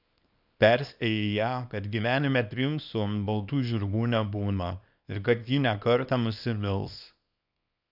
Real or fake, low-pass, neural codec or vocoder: fake; 5.4 kHz; codec, 24 kHz, 0.9 kbps, WavTokenizer, small release